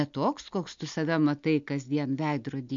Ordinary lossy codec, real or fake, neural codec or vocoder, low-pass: MP3, 48 kbps; real; none; 7.2 kHz